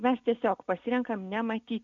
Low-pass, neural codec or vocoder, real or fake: 7.2 kHz; none; real